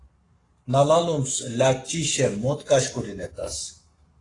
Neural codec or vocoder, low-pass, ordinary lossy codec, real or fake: codec, 44.1 kHz, 7.8 kbps, Pupu-Codec; 10.8 kHz; AAC, 32 kbps; fake